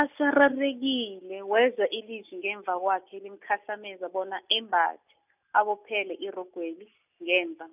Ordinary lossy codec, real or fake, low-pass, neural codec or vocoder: none; real; 3.6 kHz; none